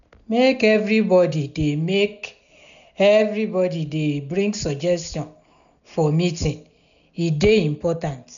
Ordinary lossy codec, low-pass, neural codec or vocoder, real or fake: none; 7.2 kHz; none; real